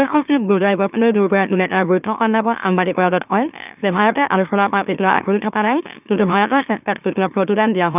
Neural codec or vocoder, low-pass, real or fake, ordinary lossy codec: autoencoder, 44.1 kHz, a latent of 192 numbers a frame, MeloTTS; 3.6 kHz; fake; none